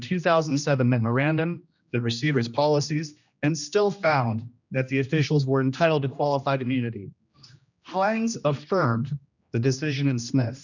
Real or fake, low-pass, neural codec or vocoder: fake; 7.2 kHz; codec, 16 kHz, 1 kbps, X-Codec, HuBERT features, trained on general audio